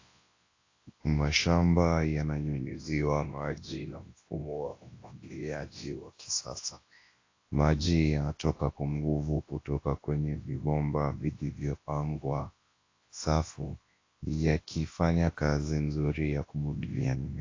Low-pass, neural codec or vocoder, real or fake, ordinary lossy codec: 7.2 kHz; codec, 24 kHz, 0.9 kbps, WavTokenizer, large speech release; fake; AAC, 32 kbps